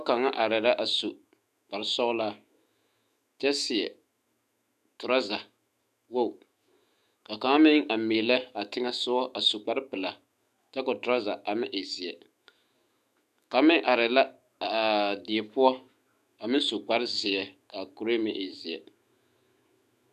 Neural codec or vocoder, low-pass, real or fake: autoencoder, 48 kHz, 128 numbers a frame, DAC-VAE, trained on Japanese speech; 14.4 kHz; fake